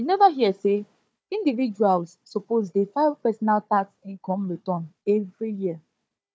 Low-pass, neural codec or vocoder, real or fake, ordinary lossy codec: none; codec, 16 kHz, 4 kbps, FunCodec, trained on Chinese and English, 50 frames a second; fake; none